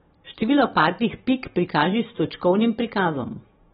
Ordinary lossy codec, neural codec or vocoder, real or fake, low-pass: AAC, 16 kbps; none; real; 9.9 kHz